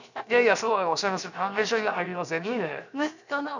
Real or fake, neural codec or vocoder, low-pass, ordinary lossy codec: fake; codec, 16 kHz, 0.7 kbps, FocalCodec; 7.2 kHz; none